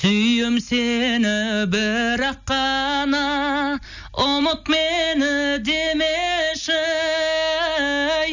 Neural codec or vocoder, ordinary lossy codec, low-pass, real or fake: none; none; 7.2 kHz; real